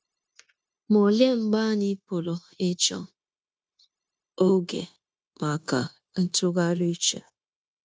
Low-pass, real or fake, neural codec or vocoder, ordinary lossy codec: none; fake; codec, 16 kHz, 0.9 kbps, LongCat-Audio-Codec; none